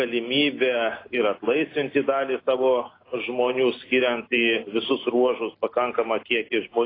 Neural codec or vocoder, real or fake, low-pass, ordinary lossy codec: none; real; 5.4 kHz; AAC, 24 kbps